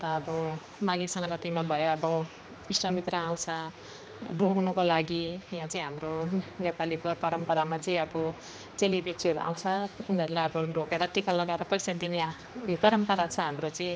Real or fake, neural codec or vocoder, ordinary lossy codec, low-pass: fake; codec, 16 kHz, 2 kbps, X-Codec, HuBERT features, trained on general audio; none; none